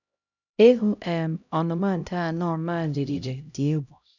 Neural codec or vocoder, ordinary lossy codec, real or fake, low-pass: codec, 16 kHz, 0.5 kbps, X-Codec, HuBERT features, trained on LibriSpeech; none; fake; 7.2 kHz